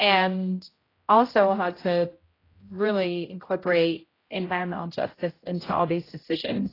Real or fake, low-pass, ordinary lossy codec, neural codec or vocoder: fake; 5.4 kHz; AAC, 24 kbps; codec, 16 kHz, 0.5 kbps, X-Codec, HuBERT features, trained on general audio